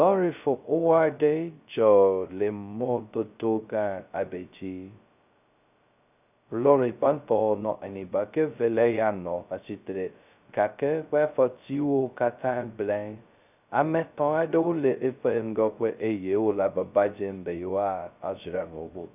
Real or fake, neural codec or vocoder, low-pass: fake; codec, 16 kHz, 0.2 kbps, FocalCodec; 3.6 kHz